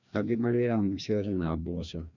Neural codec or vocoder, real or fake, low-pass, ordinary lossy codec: codec, 16 kHz, 1 kbps, FreqCodec, larger model; fake; 7.2 kHz; none